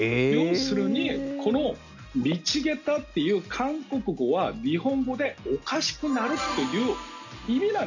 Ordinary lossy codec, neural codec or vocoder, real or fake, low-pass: none; none; real; 7.2 kHz